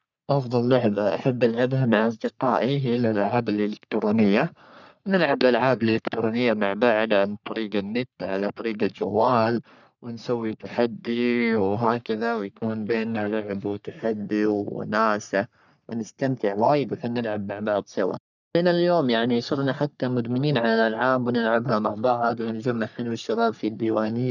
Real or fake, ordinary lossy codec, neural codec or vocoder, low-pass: fake; none; codec, 44.1 kHz, 3.4 kbps, Pupu-Codec; 7.2 kHz